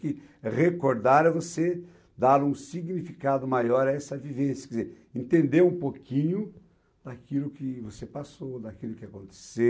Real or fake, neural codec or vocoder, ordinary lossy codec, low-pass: real; none; none; none